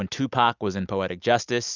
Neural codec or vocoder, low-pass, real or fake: none; 7.2 kHz; real